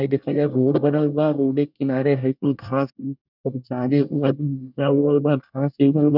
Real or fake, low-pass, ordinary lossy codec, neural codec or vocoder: fake; 5.4 kHz; none; codec, 24 kHz, 1 kbps, SNAC